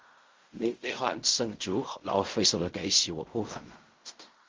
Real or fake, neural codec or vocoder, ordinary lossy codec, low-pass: fake; codec, 16 kHz in and 24 kHz out, 0.4 kbps, LongCat-Audio-Codec, fine tuned four codebook decoder; Opus, 32 kbps; 7.2 kHz